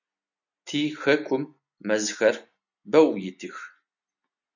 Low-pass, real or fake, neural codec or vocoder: 7.2 kHz; real; none